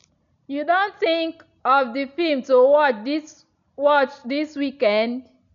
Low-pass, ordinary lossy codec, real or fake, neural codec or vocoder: 7.2 kHz; none; real; none